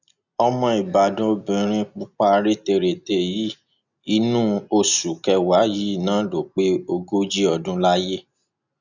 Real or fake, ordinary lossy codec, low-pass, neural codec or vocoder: real; none; 7.2 kHz; none